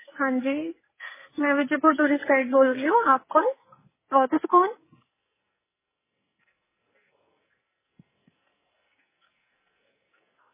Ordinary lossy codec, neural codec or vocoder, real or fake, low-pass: MP3, 16 kbps; codec, 44.1 kHz, 2.6 kbps, SNAC; fake; 3.6 kHz